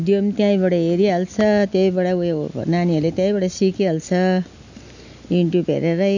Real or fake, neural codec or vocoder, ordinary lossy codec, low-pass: real; none; none; 7.2 kHz